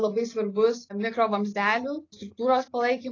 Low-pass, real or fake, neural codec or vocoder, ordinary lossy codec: 7.2 kHz; real; none; AAC, 32 kbps